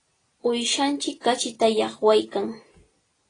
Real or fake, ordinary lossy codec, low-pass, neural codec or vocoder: real; AAC, 32 kbps; 9.9 kHz; none